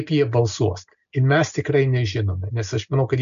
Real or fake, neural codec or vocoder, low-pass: real; none; 7.2 kHz